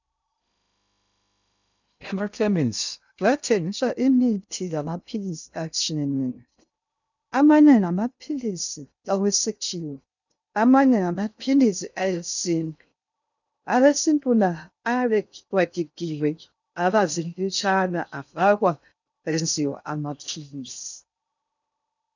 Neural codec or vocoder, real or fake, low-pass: codec, 16 kHz in and 24 kHz out, 0.6 kbps, FocalCodec, streaming, 2048 codes; fake; 7.2 kHz